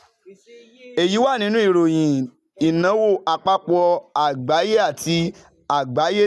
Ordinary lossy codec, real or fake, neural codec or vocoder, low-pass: none; real; none; none